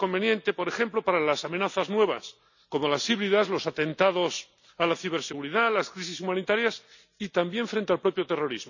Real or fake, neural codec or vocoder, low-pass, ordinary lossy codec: real; none; 7.2 kHz; none